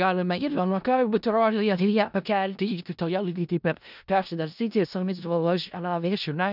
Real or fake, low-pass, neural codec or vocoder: fake; 5.4 kHz; codec, 16 kHz in and 24 kHz out, 0.4 kbps, LongCat-Audio-Codec, four codebook decoder